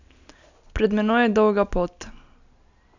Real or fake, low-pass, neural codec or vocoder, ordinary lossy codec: real; 7.2 kHz; none; none